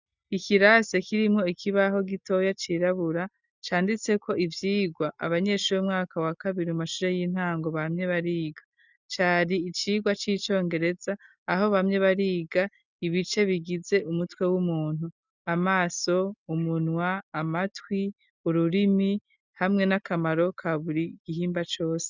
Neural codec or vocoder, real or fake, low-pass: none; real; 7.2 kHz